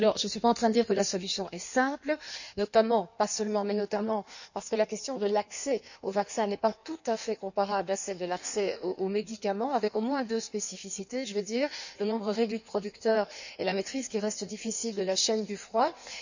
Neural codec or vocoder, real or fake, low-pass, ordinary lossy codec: codec, 16 kHz in and 24 kHz out, 1.1 kbps, FireRedTTS-2 codec; fake; 7.2 kHz; none